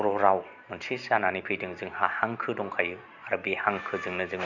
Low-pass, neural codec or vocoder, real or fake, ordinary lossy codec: 7.2 kHz; none; real; none